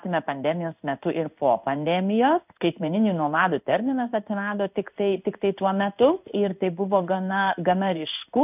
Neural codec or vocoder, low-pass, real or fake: codec, 16 kHz in and 24 kHz out, 1 kbps, XY-Tokenizer; 3.6 kHz; fake